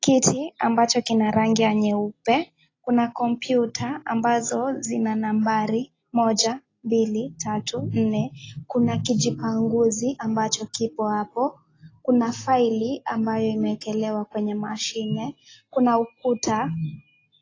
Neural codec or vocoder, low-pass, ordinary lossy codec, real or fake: none; 7.2 kHz; AAC, 32 kbps; real